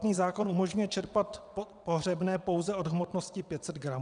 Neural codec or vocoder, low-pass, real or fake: vocoder, 22.05 kHz, 80 mel bands, WaveNeXt; 9.9 kHz; fake